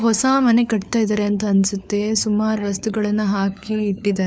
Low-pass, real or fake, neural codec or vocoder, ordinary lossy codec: none; fake; codec, 16 kHz, 4 kbps, FunCodec, trained on LibriTTS, 50 frames a second; none